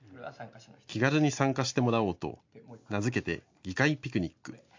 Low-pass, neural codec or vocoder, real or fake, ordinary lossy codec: 7.2 kHz; none; real; MP3, 48 kbps